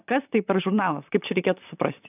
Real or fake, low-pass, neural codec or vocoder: fake; 3.6 kHz; vocoder, 44.1 kHz, 128 mel bands, Pupu-Vocoder